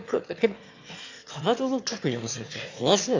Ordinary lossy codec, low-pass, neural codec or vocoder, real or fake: AAC, 48 kbps; 7.2 kHz; autoencoder, 22.05 kHz, a latent of 192 numbers a frame, VITS, trained on one speaker; fake